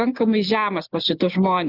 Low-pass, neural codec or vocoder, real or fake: 5.4 kHz; none; real